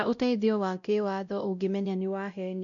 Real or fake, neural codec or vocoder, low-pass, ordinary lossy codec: fake; codec, 16 kHz, 0.5 kbps, X-Codec, WavLM features, trained on Multilingual LibriSpeech; 7.2 kHz; none